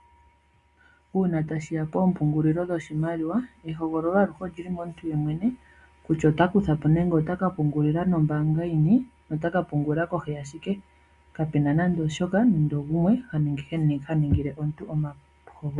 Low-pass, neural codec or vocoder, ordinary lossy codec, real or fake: 10.8 kHz; none; Opus, 64 kbps; real